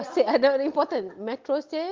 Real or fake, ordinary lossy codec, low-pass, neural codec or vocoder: real; Opus, 32 kbps; 7.2 kHz; none